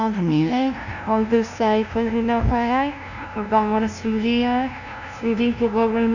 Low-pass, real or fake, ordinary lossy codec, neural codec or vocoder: 7.2 kHz; fake; none; codec, 16 kHz, 0.5 kbps, FunCodec, trained on LibriTTS, 25 frames a second